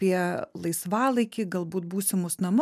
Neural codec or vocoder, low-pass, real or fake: none; 14.4 kHz; real